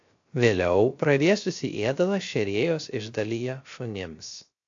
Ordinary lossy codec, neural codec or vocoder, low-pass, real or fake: AAC, 48 kbps; codec, 16 kHz, 0.3 kbps, FocalCodec; 7.2 kHz; fake